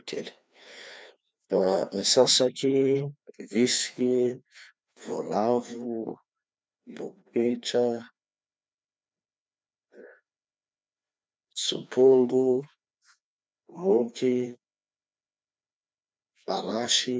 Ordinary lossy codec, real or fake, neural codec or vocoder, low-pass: none; fake; codec, 16 kHz, 2 kbps, FreqCodec, larger model; none